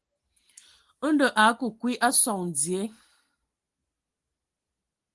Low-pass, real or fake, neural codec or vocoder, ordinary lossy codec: 10.8 kHz; real; none; Opus, 24 kbps